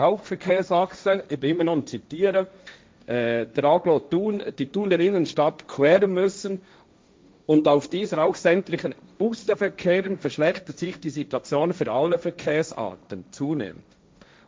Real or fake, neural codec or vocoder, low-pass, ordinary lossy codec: fake; codec, 16 kHz, 1.1 kbps, Voila-Tokenizer; none; none